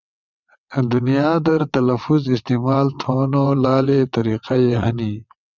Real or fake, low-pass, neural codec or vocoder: fake; 7.2 kHz; vocoder, 22.05 kHz, 80 mel bands, WaveNeXt